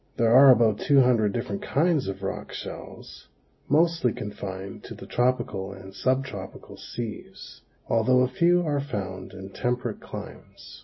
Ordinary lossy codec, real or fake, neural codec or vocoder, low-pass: MP3, 24 kbps; real; none; 7.2 kHz